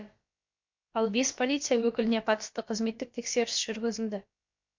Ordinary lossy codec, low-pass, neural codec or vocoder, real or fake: MP3, 48 kbps; 7.2 kHz; codec, 16 kHz, about 1 kbps, DyCAST, with the encoder's durations; fake